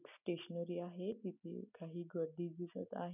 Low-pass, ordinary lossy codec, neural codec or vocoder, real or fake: 3.6 kHz; none; none; real